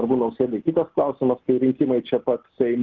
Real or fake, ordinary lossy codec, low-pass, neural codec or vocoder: real; Opus, 16 kbps; 7.2 kHz; none